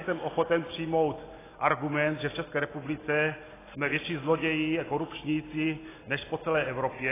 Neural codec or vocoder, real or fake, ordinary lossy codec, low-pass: none; real; AAC, 16 kbps; 3.6 kHz